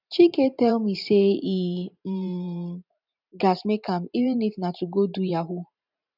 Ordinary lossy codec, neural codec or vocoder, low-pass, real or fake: none; vocoder, 44.1 kHz, 128 mel bands every 512 samples, BigVGAN v2; 5.4 kHz; fake